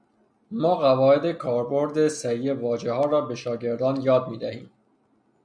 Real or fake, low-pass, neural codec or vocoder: real; 9.9 kHz; none